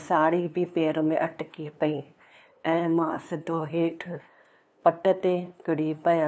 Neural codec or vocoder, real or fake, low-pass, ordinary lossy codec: codec, 16 kHz, 2 kbps, FunCodec, trained on LibriTTS, 25 frames a second; fake; none; none